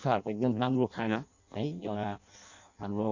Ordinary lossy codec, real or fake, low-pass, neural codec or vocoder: none; fake; 7.2 kHz; codec, 16 kHz in and 24 kHz out, 0.6 kbps, FireRedTTS-2 codec